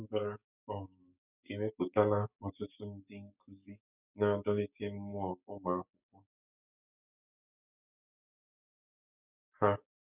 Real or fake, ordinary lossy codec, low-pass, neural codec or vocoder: fake; none; 3.6 kHz; codec, 16 kHz, 6 kbps, DAC